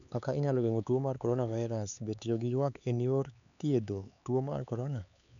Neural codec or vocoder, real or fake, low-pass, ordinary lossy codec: codec, 16 kHz, 4 kbps, X-Codec, HuBERT features, trained on LibriSpeech; fake; 7.2 kHz; none